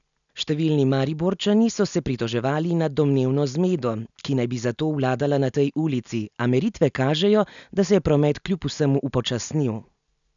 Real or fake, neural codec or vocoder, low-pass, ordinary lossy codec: real; none; 7.2 kHz; none